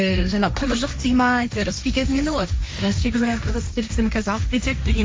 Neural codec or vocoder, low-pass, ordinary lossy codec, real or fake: codec, 16 kHz, 1.1 kbps, Voila-Tokenizer; none; none; fake